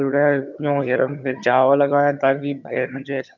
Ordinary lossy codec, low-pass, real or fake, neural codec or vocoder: none; 7.2 kHz; fake; vocoder, 22.05 kHz, 80 mel bands, HiFi-GAN